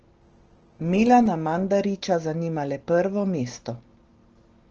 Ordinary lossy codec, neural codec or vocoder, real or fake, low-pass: Opus, 16 kbps; none; real; 7.2 kHz